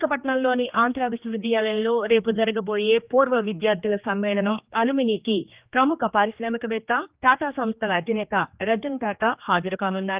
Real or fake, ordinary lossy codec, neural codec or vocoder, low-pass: fake; Opus, 24 kbps; codec, 16 kHz, 2 kbps, X-Codec, HuBERT features, trained on general audio; 3.6 kHz